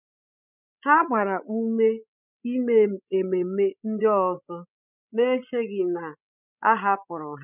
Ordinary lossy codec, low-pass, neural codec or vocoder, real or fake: none; 3.6 kHz; codec, 16 kHz, 16 kbps, FreqCodec, larger model; fake